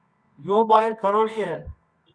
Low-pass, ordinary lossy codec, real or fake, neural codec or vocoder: 9.9 kHz; Opus, 64 kbps; fake; codec, 24 kHz, 0.9 kbps, WavTokenizer, medium music audio release